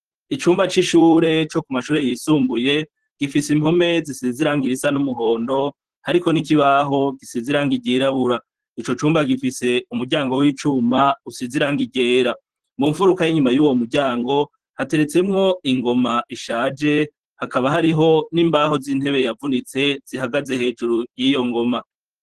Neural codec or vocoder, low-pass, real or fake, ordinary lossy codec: vocoder, 44.1 kHz, 128 mel bands, Pupu-Vocoder; 14.4 kHz; fake; Opus, 16 kbps